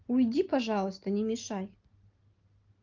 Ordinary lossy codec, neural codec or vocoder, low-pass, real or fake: Opus, 32 kbps; none; 7.2 kHz; real